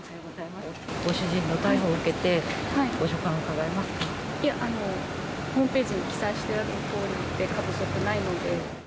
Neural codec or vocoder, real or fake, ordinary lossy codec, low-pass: none; real; none; none